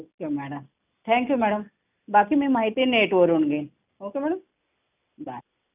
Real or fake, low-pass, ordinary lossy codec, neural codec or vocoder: real; 3.6 kHz; none; none